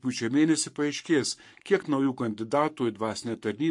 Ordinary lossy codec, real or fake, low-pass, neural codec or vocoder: MP3, 48 kbps; fake; 10.8 kHz; codec, 44.1 kHz, 7.8 kbps, Pupu-Codec